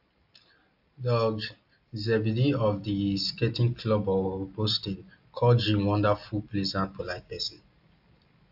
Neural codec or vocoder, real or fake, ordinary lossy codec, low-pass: none; real; AAC, 48 kbps; 5.4 kHz